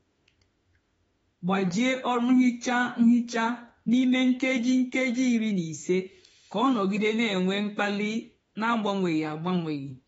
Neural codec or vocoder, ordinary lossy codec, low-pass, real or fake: autoencoder, 48 kHz, 32 numbers a frame, DAC-VAE, trained on Japanese speech; AAC, 24 kbps; 19.8 kHz; fake